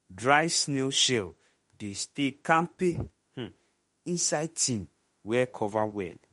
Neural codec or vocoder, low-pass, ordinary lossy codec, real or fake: autoencoder, 48 kHz, 32 numbers a frame, DAC-VAE, trained on Japanese speech; 19.8 kHz; MP3, 48 kbps; fake